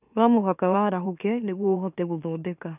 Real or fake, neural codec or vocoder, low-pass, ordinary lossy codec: fake; autoencoder, 44.1 kHz, a latent of 192 numbers a frame, MeloTTS; 3.6 kHz; none